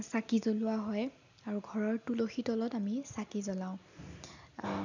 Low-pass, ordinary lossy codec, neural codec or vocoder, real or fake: 7.2 kHz; none; none; real